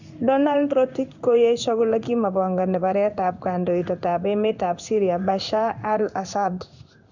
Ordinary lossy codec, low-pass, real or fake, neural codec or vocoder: none; 7.2 kHz; fake; codec, 16 kHz in and 24 kHz out, 1 kbps, XY-Tokenizer